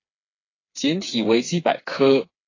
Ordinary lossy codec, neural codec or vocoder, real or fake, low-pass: AAC, 32 kbps; codec, 16 kHz, 4 kbps, FreqCodec, smaller model; fake; 7.2 kHz